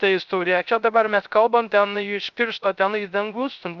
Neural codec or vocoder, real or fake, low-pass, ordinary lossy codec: codec, 16 kHz, 0.3 kbps, FocalCodec; fake; 7.2 kHz; MP3, 96 kbps